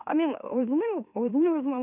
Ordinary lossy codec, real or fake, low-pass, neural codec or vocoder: none; fake; 3.6 kHz; autoencoder, 44.1 kHz, a latent of 192 numbers a frame, MeloTTS